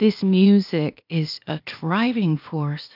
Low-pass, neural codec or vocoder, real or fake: 5.4 kHz; codec, 16 kHz, 0.8 kbps, ZipCodec; fake